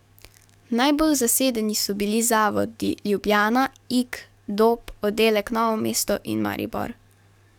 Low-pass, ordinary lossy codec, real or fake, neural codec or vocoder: 19.8 kHz; none; fake; codec, 44.1 kHz, 7.8 kbps, DAC